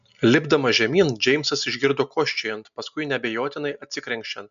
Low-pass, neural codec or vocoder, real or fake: 7.2 kHz; none; real